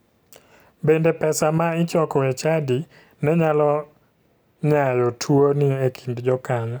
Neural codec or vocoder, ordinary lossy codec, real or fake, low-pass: none; none; real; none